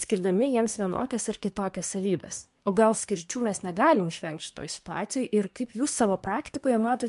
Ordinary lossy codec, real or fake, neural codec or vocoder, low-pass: MP3, 64 kbps; fake; codec, 24 kHz, 1 kbps, SNAC; 10.8 kHz